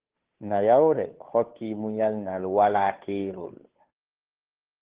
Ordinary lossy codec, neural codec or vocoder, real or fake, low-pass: Opus, 16 kbps; codec, 16 kHz, 2 kbps, FunCodec, trained on Chinese and English, 25 frames a second; fake; 3.6 kHz